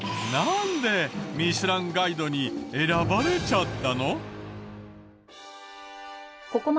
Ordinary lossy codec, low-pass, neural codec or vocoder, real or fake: none; none; none; real